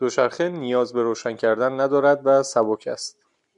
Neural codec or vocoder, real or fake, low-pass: none; real; 10.8 kHz